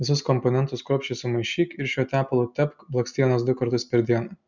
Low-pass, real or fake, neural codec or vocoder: 7.2 kHz; real; none